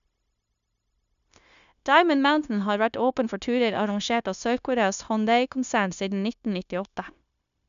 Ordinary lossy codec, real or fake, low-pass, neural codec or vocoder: none; fake; 7.2 kHz; codec, 16 kHz, 0.9 kbps, LongCat-Audio-Codec